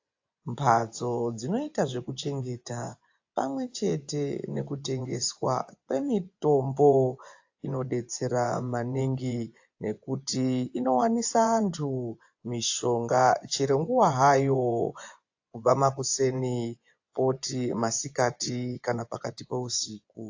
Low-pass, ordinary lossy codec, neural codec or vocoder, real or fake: 7.2 kHz; AAC, 48 kbps; vocoder, 24 kHz, 100 mel bands, Vocos; fake